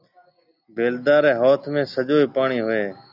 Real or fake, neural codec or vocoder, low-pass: real; none; 5.4 kHz